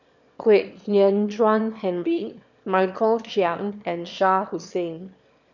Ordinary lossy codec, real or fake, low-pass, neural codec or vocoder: none; fake; 7.2 kHz; autoencoder, 22.05 kHz, a latent of 192 numbers a frame, VITS, trained on one speaker